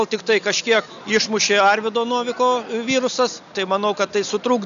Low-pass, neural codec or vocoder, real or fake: 7.2 kHz; none; real